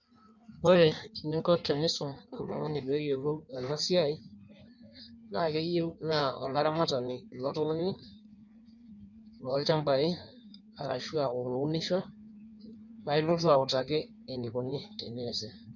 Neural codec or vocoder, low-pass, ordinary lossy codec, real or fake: codec, 16 kHz in and 24 kHz out, 1.1 kbps, FireRedTTS-2 codec; 7.2 kHz; none; fake